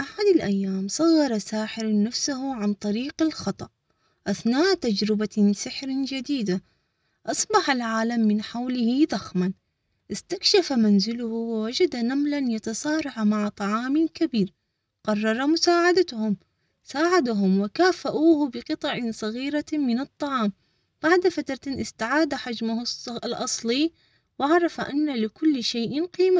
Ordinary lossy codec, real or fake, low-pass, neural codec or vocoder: none; real; none; none